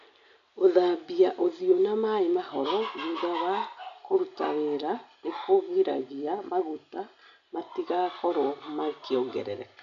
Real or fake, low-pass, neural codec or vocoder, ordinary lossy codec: real; 7.2 kHz; none; none